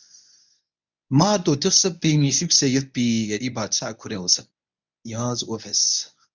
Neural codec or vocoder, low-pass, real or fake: codec, 24 kHz, 0.9 kbps, WavTokenizer, medium speech release version 1; 7.2 kHz; fake